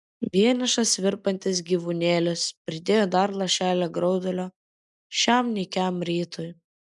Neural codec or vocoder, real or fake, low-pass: none; real; 10.8 kHz